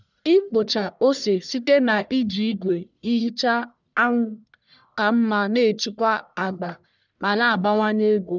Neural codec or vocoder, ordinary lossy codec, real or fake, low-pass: codec, 44.1 kHz, 1.7 kbps, Pupu-Codec; none; fake; 7.2 kHz